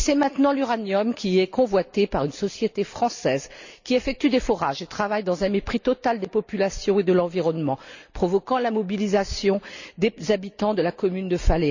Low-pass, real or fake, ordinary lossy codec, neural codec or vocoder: 7.2 kHz; real; none; none